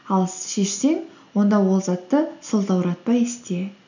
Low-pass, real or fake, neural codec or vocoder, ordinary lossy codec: 7.2 kHz; real; none; none